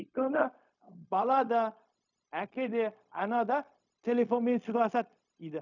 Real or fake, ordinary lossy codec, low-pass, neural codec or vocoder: fake; none; 7.2 kHz; codec, 16 kHz, 0.4 kbps, LongCat-Audio-Codec